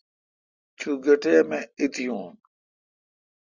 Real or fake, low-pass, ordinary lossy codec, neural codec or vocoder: real; 7.2 kHz; Opus, 64 kbps; none